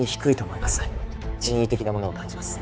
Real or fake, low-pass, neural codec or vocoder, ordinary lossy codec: fake; none; codec, 16 kHz, 4 kbps, X-Codec, HuBERT features, trained on balanced general audio; none